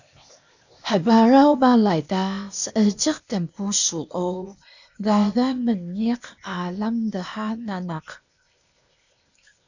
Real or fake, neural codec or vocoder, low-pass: fake; codec, 16 kHz, 0.8 kbps, ZipCodec; 7.2 kHz